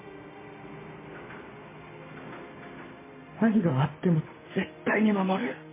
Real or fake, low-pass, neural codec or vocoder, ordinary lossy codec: real; 3.6 kHz; none; MP3, 16 kbps